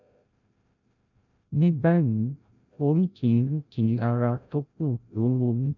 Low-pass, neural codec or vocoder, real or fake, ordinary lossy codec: 7.2 kHz; codec, 16 kHz, 0.5 kbps, FreqCodec, larger model; fake; none